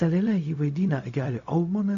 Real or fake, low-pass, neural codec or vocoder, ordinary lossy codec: fake; 7.2 kHz; codec, 16 kHz, 0.4 kbps, LongCat-Audio-Codec; AAC, 64 kbps